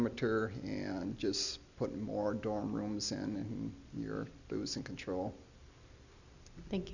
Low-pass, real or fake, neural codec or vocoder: 7.2 kHz; real; none